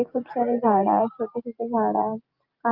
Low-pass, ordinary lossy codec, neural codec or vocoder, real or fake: 5.4 kHz; Opus, 24 kbps; none; real